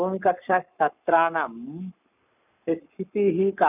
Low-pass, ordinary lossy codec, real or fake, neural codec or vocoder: 3.6 kHz; none; fake; codec, 44.1 kHz, 7.8 kbps, Pupu-Codec